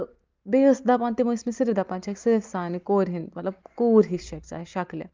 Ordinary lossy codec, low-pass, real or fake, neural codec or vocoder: Opus, 24 kbps; 7.2 kHz; real; none